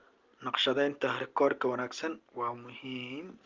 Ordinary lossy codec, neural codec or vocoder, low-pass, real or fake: Opus, 32 kbps; none; 7.2 kHz; real